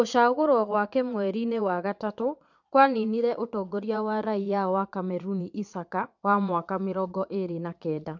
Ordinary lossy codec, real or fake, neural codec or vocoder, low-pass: none; fake; vocoder, 22.05 kHz, 80 mel bands, Vocos; 7.2 kHz